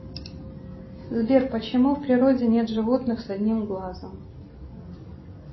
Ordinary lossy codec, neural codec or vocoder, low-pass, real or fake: MP3, 24 kbps; none; 7.2 kHz; real